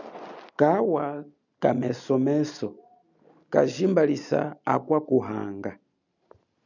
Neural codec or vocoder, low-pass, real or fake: none; 7.2 kHz; real